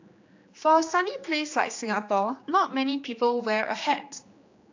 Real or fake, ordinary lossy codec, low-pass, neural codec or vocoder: fake; AAC, 48 kbps; 7.2 kHz; codec, 16 kHz, 2 kbps, X-Codec, HuBERT features, trained on general audio